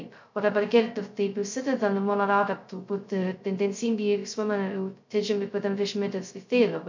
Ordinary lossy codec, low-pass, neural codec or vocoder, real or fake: MP3, 64 kbps; 7.2 kHz; codec, 16 kHz, 0.2 kbps, FocalCodec; fake